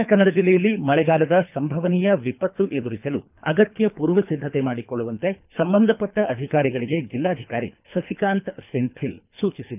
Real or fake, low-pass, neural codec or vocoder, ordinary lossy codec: fake; 3.6 kHz; codec, 24 kHz, 3 kbps, HILCodec; none